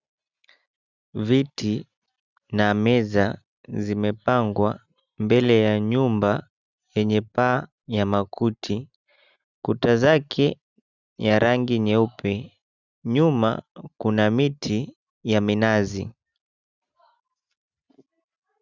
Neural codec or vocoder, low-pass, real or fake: none; 7.2 kHz; real